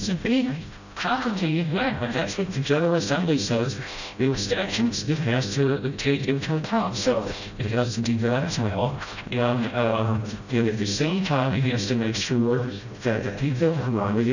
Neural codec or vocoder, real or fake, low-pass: codec, 16 kHz, 0.5 kbps, FreqCodec, smaller model; fake; 7.2 kHz